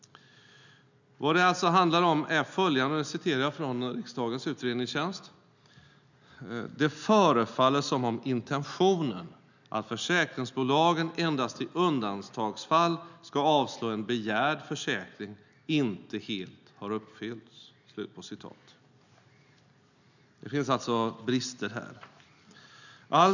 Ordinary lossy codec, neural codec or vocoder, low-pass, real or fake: none; none; 7.2 kHz; real